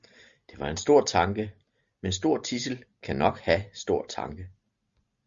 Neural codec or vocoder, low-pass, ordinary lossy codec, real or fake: none; 7.2 kHz; Opus, 64 kbps; real